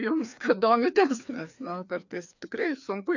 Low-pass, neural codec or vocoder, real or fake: 7.2 kHz; codec, 16 kHz, 2 kbps, FreqCodec, larger model; fake